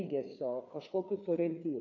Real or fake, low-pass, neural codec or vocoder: fake; 7.2 kHz; codec, 16 kHz, 4 kbps, FreqCodec, larger model